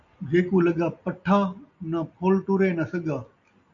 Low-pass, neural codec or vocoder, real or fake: 7.2 kHz; none; real